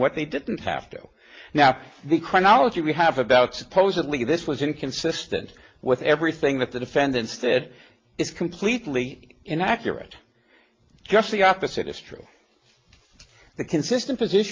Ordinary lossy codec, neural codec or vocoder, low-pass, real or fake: Opus, 24 kbps; none; 7.2 kHz; real